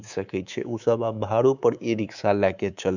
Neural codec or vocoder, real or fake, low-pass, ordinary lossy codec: codec, 24 kHz, 3.1 kbps, DualCodec; fake; 7.2 kHz; none